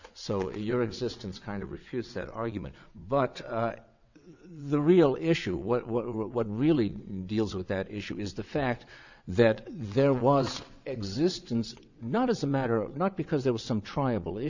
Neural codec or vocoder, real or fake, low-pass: vocoder, 22.05 kHz, 80 mel bands, WaveNeXt; fake; 7.2 kHz